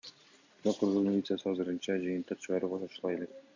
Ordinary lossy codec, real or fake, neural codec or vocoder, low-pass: MP3, 64 kbps; real; none; 7.2 kHz